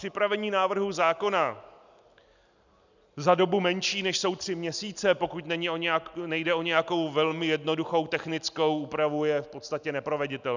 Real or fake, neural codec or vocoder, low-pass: real; none; 7.2 kHz